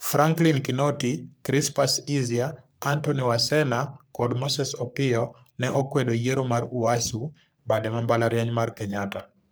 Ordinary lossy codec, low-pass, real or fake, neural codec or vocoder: none; none; fake; codec, 44.1 kHz, 3.4 kbps, Pupu-Codec